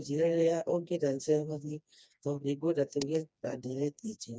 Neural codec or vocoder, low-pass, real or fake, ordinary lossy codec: codec, 16 kHz, 2 kbps, FreqCodec, smaller model; none; fake; none